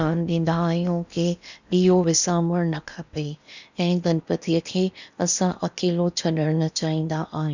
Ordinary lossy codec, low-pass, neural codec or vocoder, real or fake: none; 7.2 kHz; codec, 16 kHz in and 24 kHz out, 0.8 kbps, FocalCodec, streaming, 65536 codes; fake